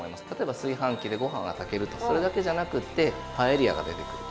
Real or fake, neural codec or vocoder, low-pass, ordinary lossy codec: real; none; none; none